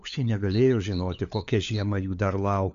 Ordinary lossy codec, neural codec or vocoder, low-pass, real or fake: AAC, 64 kbps; codec, 16 kHz, 4 kbps, FunCodec, trained on LibriTTS, 50 frames a second; 7.2 kHz; fake